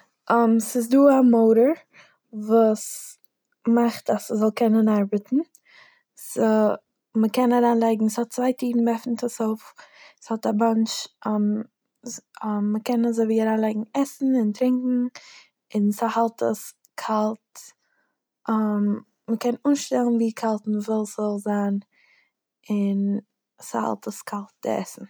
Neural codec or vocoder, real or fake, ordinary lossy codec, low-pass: none; real; none; none